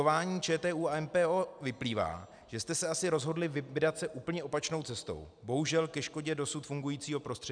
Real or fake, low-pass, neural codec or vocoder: real; 9.9 kHz; none